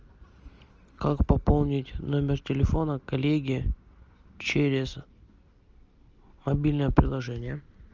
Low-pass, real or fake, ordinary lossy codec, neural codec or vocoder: 7.2 kHz; real; Opus, 24 kbps; none